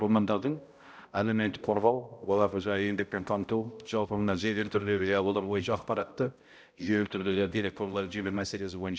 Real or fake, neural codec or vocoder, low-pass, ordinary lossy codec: fake; codec, 16 kHz, 0.5 kbps, X-Codec, HuBERT features, trained on balanced general audio; none; none